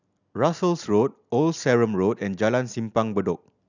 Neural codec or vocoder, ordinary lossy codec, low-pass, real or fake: none; none; 7.2 kHz; real